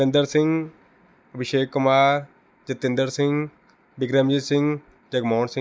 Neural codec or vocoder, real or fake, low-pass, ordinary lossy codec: none; real; none; none